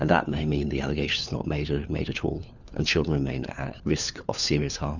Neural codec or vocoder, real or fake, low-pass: codec, 16 kHz, 4 kbps, FunCodec, trained on LibriTTS, 50 frames a second; fake; 7.2 kHz